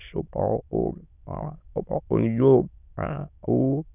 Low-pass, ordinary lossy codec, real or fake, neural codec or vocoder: 3.6 kHz; none; fake; autoencoder, 22.05 kHz, a latent of 192 numbers a frame, VITS, trained on many speakers